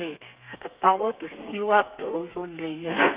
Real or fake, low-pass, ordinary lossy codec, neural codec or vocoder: fake; 3.6 kHz; Opus, 64 kbps; codec, 32 kHz, 1.9 kbps, SNAC